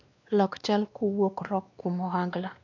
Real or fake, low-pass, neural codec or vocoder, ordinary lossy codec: fake; 7.2 kHz; codec, 16 kHz, 2 kbps, X-Codec, WavLM features, trained on Multilingual LibriSpeech; AAC, 48 kbps